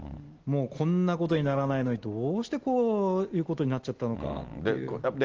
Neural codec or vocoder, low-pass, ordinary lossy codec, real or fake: none; 7.2 kHz; Opus, 16 kbps; real